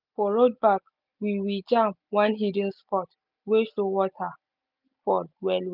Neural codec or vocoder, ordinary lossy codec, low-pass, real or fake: none; none; 5.4 kHz; real